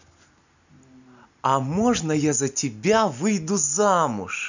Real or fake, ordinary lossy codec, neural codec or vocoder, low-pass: real; none; none; 7.2 kHz